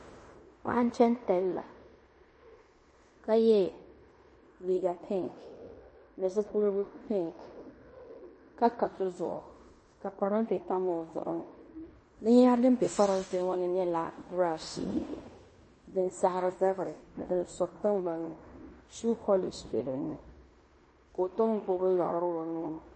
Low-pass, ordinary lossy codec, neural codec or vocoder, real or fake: 9.9 kHz; MP3, 32 kbps; codec, 16 kHz in and 24 kHz out, 0.9 kbps, LongCat-Audio-Codec, fine tuned four codebook decoder; fake